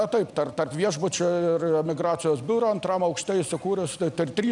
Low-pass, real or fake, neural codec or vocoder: 10.8 kHz; real; none